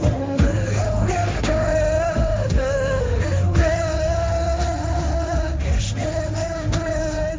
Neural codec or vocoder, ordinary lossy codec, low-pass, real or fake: codec, 16 kHz, 1.1 kbps, Voila-Tokenizer; none; none; fake